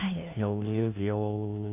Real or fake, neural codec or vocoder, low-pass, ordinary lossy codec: fake; codec, 16 kHz, 1 kbps, FunCodec, trained on LibriTTS, 50 frames a second; 3.6 kHz; none